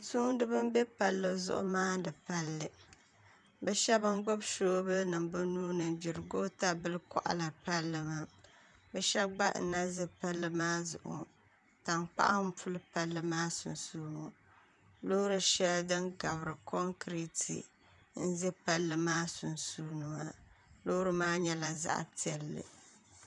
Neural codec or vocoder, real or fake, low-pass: vocoder, 44.1 kHz, 128 mel bands, Pupu-Vocoder; fake; 10.8 kHz